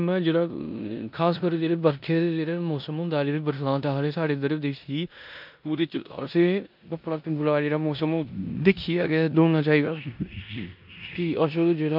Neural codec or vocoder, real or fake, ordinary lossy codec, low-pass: codec, 16 kHz in and 24 kHz out, 0.9 kbps, LongCat-Audio-Codec, four codebook decoder; fake; MP3, 48 kbps; 5.4 kHz